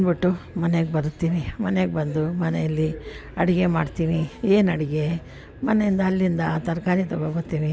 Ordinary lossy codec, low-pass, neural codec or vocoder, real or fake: none; none; none; real